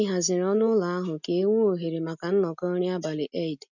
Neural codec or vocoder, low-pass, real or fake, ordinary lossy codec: none; 7.2 kHz; real; none